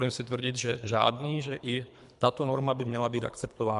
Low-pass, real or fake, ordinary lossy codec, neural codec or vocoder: 10.8 kHz; fake; MP3, 96 kbps; codec, 24 kHz, 3 kbps, HILCodec